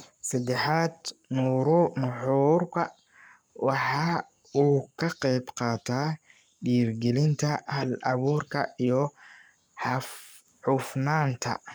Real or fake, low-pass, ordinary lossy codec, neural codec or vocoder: fake; none; none; codec, 44.1 kHz, 7.8 kbps, Pupu-Codec